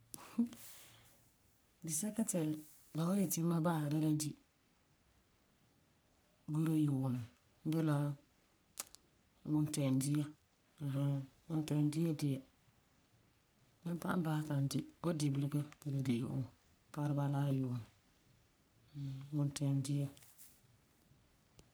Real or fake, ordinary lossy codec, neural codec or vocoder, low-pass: fake; none; codec, 44.1 kHz, 3.4 kbps, Pupu-Codec; none